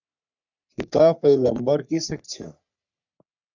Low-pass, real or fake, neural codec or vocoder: 7.2 kHz; fake; codec, 44.1 kHz, 3.4 kbps, Pupu-Codec